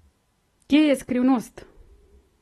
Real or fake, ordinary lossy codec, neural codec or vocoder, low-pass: real; AAC, 32 kbps; none; 19.8 kHz